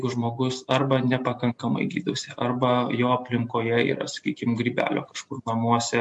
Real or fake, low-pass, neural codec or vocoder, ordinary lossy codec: real; 10.8 kHz; none; MP3, 64 kbps